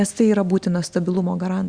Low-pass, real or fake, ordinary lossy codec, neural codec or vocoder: 9.9 kHz; real; Opus, 64 kbps; none